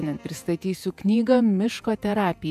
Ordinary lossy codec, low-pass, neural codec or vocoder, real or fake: MP3, 96 kbps; 14.4 kHz; vocoder, 48 kHz, 128 mel bands, Vocos; fake